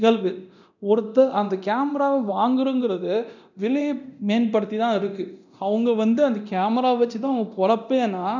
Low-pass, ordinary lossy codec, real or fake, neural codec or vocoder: 7.2 kHz; none; fake; codec, 24 kHz, 0.9 kbps, DualCodec